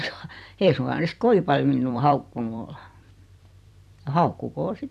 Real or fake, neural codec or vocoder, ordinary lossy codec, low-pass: fake; vocoder, 44.1 kHz, 128 mel bands every 256 samples, BigVGAN v2; none; 14.4 kHz